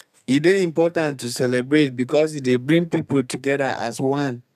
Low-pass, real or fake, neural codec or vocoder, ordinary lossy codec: 14.4 kHz; fake; codec, 32 kHz, 1.9 kbps, SNAC; none